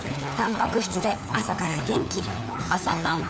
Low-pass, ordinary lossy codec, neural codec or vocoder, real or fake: none; none; codec, 16 kHz, 4 kbps, FunCodec, trained on LibriTTS, 50 frames a second; fake